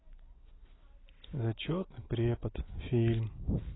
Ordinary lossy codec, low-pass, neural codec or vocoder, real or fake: AAC, 16 kbps; 7.2 kHz; none; real